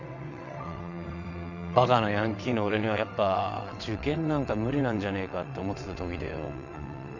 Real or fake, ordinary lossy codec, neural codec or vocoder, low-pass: fake; none; vocoder, 22.05 kHz, 80 mel bands, WaveNeXt; 7.2 kHz